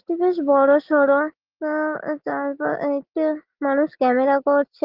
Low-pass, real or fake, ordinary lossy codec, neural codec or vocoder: 5.4 kHz; real; Opus, 16 kbps; none